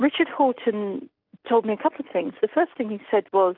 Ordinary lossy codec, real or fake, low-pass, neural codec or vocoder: AAC, 48 kbps; real; 5.4 kHz; none